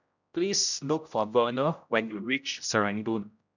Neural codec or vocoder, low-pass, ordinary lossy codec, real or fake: codec, 16 kHz, 0.5 kbps, X-Codec, HuBERT features, trained on general audio; 7.2 kHz; none; fake